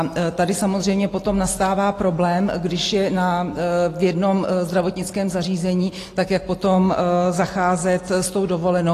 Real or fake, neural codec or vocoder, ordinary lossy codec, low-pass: real; none; AAC, 48 kbps; 14.4 kHz